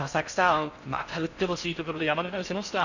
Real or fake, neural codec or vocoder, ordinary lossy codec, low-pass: fake; codec, 16 kHz in and 24 kHz out, 0.6 kbps, FocalCodec, streaming, 4096 codes; none; 7.2 kHz